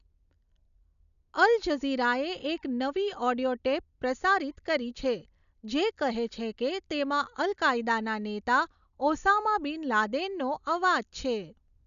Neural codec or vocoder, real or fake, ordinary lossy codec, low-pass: none; real; none; 7.2 kHz